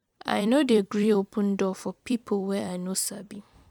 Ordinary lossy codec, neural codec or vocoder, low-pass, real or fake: none; vocoder, 44.1 kHz, 128 mel bands every 256 samples, BigVGAN v2; 19.8 kHz; fake